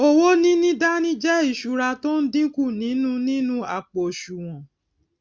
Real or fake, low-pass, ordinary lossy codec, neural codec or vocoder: real; none; none; none